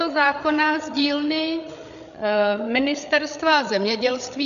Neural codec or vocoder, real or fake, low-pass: codec, 16 kHz, 8 kbps, FreqCodec, larger model; fake; 7.2 kHz